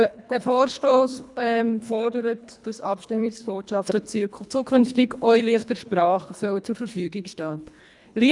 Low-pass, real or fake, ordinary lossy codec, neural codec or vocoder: 10.8 kHz; fake; none; codec, 24 kHz, 1.5 kbps, HILCodec